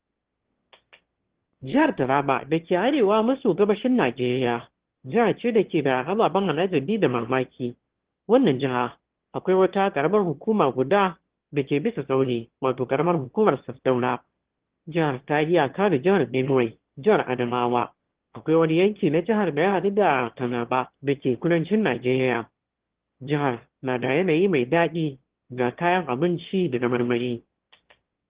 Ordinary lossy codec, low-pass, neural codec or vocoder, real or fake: Opus, 16 kbps; 3.6 kHz; autoencoder, 22.05 kHz, a latent of 192 numbers a frame, VITS, trained on one speaker; fake